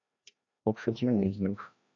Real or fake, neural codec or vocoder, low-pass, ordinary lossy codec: fake; codec, 16 kHz, 1 kbps, FreqCodec, larger model; 7.2 kHz; AAC, 48 kbps